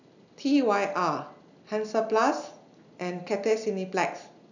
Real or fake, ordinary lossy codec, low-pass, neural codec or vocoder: real; none; 7.2 kHz; none